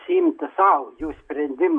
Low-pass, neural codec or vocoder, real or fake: 9.9 kHz; none; real